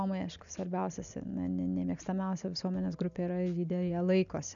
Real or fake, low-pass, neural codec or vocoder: real; 7.2 kHz; none